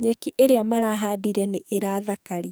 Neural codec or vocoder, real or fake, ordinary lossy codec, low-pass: codec, 44.1 kHz, 2.6 kbps, SNAC; fake; none; none